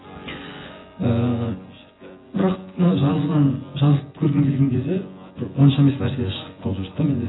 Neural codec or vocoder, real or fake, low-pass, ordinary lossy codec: vocoder, 24 kHz, 100 mel bands, Vocos; fake; 7.2 kHz; AAC, 16 kbps